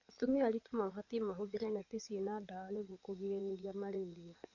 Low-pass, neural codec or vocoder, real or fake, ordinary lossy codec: 7.2 kHz; codec, 16 kHz in and 24 kHz out, 2.2 kbps, FireRedTTS-2 codec; fake; none